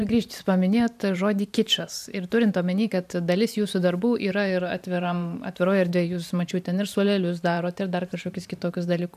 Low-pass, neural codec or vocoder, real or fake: 14.4 kHz; vocoder, 44.1 kHz, 128 mel bands every 512 samples, BigVGAN v2; fake